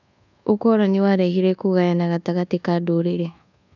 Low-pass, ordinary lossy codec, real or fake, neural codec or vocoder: 7.2 kHz; none; fake; codec, 24 kHz, 1.2 kbps, DualCodec